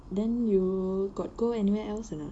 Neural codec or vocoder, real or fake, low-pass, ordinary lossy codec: none; real; 9.9 kHz; AAC, 64 kbps